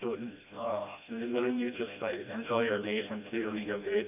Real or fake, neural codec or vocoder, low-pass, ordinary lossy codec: fake; codec, 16 kHz, 1 kbps, FreqCodec, smaller model; 3.6 kHz; none